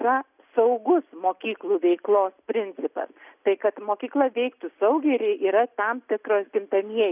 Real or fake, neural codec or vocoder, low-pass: real; none; 3.6 kHz